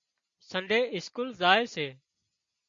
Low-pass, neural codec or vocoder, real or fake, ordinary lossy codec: 7.2 kHz; none; real; MP3, 48 kbps